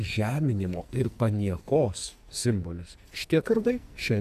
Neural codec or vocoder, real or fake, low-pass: codec, 44.1 kHz, 2.6 kbps, SNAC; fake; 14.4 kHz